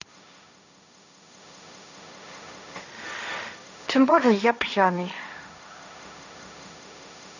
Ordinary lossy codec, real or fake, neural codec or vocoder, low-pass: none; fake; codec, 16 kHz, 1.1 kbps, Voila-Tokenizer; 7.2 kHz